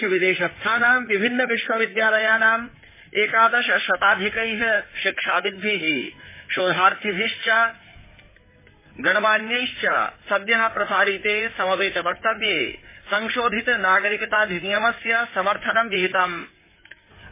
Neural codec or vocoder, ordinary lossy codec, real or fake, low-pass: codec, 16 kHz in and 24 kHz out, 2.2 kbps, FireRedTTS-2 codec; MP3, 16 kbps; fake; 3.6 kHz